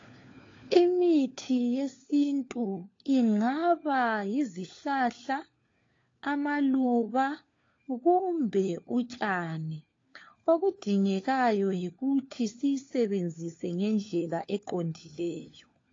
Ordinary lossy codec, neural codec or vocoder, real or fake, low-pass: AAC, 32 kbps; codec, 16 kHz, 4 kbps, FunCodec, trained on LibriTTS, 50 frames a second; fake; 7.2 kHz